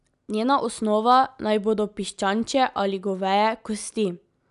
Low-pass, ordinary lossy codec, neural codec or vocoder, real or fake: 10.8 kHz; none; none; real